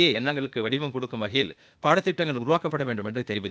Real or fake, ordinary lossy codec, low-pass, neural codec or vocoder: fake; none; none; codec, 16 kHz, 0.8 kbps, ZipCodec